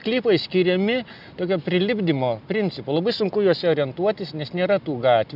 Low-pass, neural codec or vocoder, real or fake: 5.4 kHz; none; real